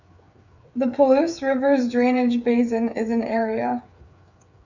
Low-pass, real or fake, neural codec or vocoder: 7.2 kHz; fake; codec, 16 kHz, 8 kbps, FreqCodec, smaller model